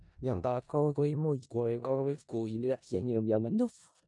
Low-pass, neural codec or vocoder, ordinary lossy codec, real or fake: 10.8 kHz; codec, 16 kHz in and 24 kHz out, 0.4 kbps, LongCat-Audio-Codec, four codebook decoder; none; fake